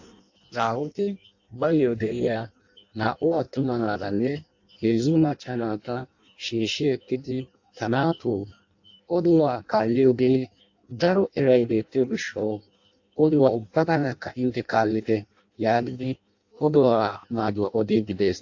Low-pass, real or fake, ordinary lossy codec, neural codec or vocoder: 7.2 kHz; fake; AAC, 48 kbps; codec, 16 kHz in and 24 kHz out, 0.6 kbps, FireRedTTS-2 codec